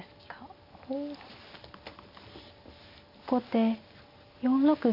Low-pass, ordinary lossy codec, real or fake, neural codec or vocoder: 5.4 kHz; none; real; none